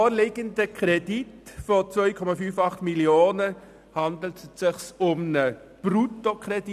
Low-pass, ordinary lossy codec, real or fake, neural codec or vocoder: 14.4 kHz; none; real; none